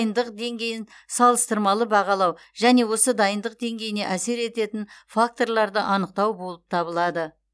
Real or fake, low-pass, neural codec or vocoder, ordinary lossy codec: real; none; none; none